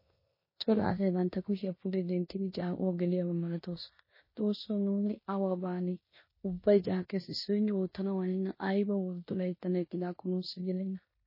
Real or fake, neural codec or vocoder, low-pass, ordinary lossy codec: fake; codec, 16 kHz in and 24 kHz out, 0.9 kbps, LongCat-Audio-Codec, four codebook decoder; 5.4 kHz; MP3, 24 kbps